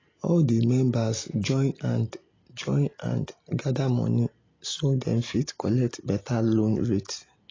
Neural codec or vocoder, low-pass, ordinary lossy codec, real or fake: none; 7.2 kHz; AAC, 32 kbps; real